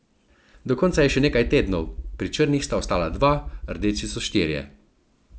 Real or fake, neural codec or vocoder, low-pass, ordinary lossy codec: real; none; none; none